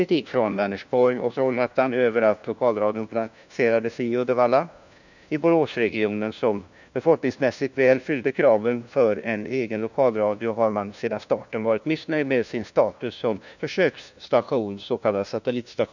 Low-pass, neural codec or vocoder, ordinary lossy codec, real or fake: 7.2 kHz; codec, 16 kHz, 1 kbps, FunCodec, trained on LibriTTS, 50 frames a second; none; fake